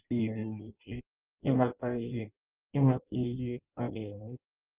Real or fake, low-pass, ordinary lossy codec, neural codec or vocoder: fake; 3.6 kHz; Opus, 32 kbps; codec, 16 kHz in and 24 kHz out, 0.6 kbps, FireRedTTS-2 codec